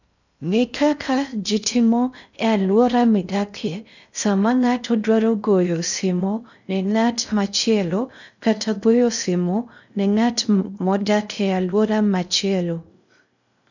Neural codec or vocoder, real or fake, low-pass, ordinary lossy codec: codec, 16 kHz in and 24 kHz out, 0.6 kbps, FocalCodec, streaming, 2048 codes; fake; 7.2 kHz; none